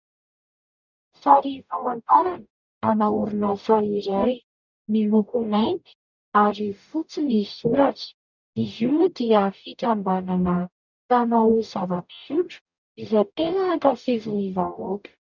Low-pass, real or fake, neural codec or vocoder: 7.2 kHz; fake; codec, 44.1 kHz, 0.9 kbps, DAC